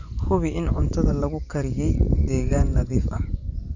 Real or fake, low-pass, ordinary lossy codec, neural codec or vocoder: fake; 7.2 kHz; none; vocoder, 24 kHz, 100 mel bands, Vocos